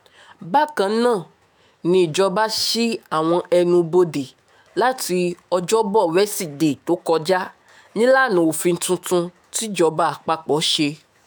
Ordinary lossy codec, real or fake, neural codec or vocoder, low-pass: none; fake; autoencoder, 48 kHz, 128 numbers a frame, DAC-VAE, trained on Japanese speech; none